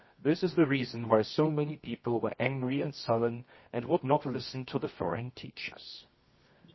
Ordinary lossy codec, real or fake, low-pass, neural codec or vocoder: MP3, 24 kbps; fake; 7.2 kHz; codec, 24 kHz, 0.9 kbps, WavTokenizer, medium music audio release